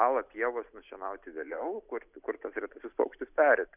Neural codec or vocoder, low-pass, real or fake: none; 3.6 kHz; real